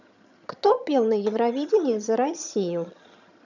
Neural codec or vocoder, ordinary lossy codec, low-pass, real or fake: vocoder, 22.05 kHz, 80 mel bands, HiFi-GAN; none; 7.2 kHz; fake